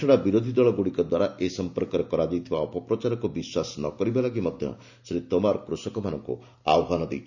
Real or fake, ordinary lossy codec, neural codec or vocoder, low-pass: real; none; none; 7.2 kHz